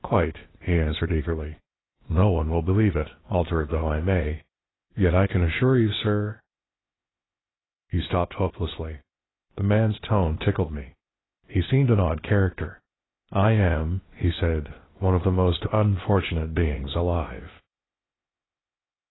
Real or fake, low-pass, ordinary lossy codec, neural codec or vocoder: fake; 7.2 kHz; AAC, 16 kbps; codec, 16 kHz, 0.8 kbps, ZipCodec